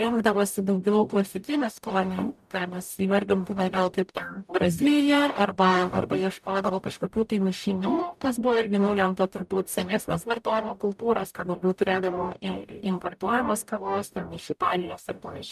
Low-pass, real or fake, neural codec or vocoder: 14.4 kHz; fake; codec, 44.1 kHz, 0.9 kbps, DAC